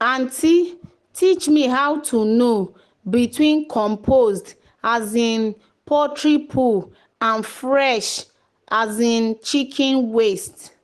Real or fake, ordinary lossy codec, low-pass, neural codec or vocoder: real; Opus, 16 kbps; 10.8 kHz; none